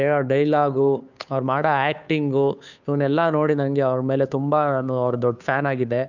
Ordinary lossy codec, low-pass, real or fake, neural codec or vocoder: none; 7.2 kHz; fake; codec, 16 kHz, 2 kbps, FunCodec, trained on Chinese and English, 25 frames a second